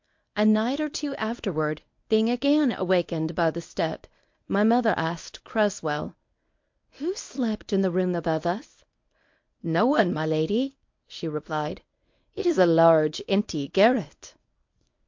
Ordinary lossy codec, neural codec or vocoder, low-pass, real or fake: MP3, 48 kbps; codec, 24 kHz, 0.9 kbps, WavTokenizer, medium speech release version 1; 7.2 kHz; fake